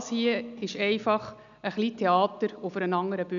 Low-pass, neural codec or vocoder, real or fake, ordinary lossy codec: 7.2 kHz; none; real; none